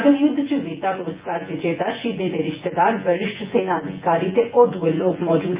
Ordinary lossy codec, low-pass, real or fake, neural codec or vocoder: Opus, 24 kbps; 3.6 kHz; fake; vocoder, 24 kHz, 100 mel bands, Vocos